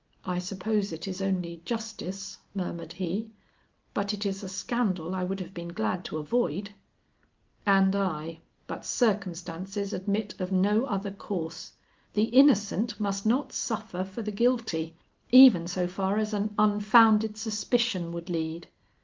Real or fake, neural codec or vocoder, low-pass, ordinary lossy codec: real; none; 7.2 kHz; Opus, 24 kbps